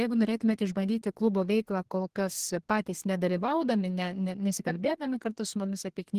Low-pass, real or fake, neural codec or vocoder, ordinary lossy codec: 14.4 kHz; fake; codec, 32 kHz, 1.9 kbps, SNAC; Opus, 16 kbps